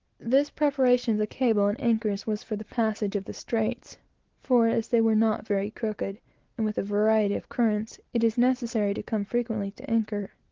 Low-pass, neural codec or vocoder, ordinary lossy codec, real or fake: 7.2 kHz; none; Opus, 16 kbps; real